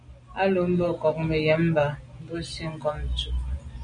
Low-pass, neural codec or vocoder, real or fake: 9.9 kHz; none; real